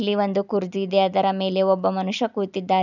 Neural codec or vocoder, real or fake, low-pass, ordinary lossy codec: none; real; 7.2 kHz; none